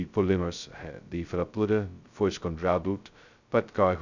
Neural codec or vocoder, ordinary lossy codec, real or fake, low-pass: codec, 16 kHz, 0.2 kbps, FocalCodec; none; fake; 7.2 kHz